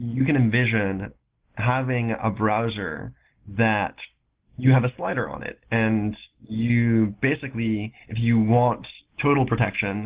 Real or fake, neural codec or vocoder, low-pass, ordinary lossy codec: real; none; 3.6 kHz; Opus, 16 kbps